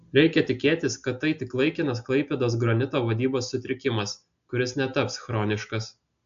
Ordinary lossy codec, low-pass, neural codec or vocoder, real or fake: AAC, 64 kbps; 7.2 kHz; none; real